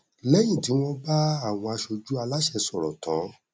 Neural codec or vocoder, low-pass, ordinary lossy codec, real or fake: none; none; none; real